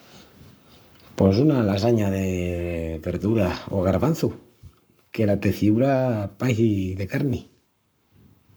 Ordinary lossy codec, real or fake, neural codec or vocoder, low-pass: none; fake; codec, 44.1 kHz, 7.8 kbps, Pupu-Codec; none